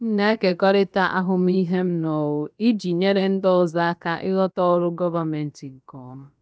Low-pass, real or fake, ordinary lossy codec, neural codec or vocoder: none; fake; none; codec, 16 kHz, about 1 kbps, DyCAST, with the encoder's durations